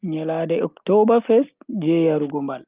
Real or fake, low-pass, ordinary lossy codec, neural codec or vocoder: real; 3.6 kHz; Opus, 32 kbps; none